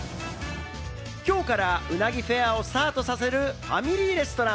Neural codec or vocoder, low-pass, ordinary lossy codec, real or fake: none; none; none; real